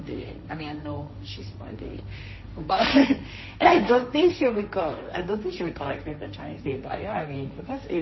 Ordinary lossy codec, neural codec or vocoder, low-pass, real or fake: MP3, 24 kbps; codec, 16 kHz, 1.1 kbps, Voila-Tokenizer; 7.2 kHz; fake